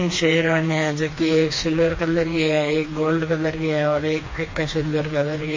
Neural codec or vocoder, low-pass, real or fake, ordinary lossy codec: codec, 16 kHz, 2 kbps, FreqCodec, smaller model; 7.2 kHz; fake; MP3, 32 kbps